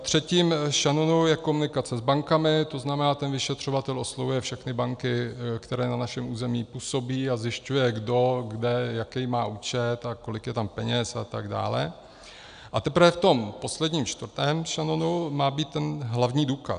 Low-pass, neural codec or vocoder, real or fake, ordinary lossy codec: 9.9 kHz; none; real; MP3, 96 kbps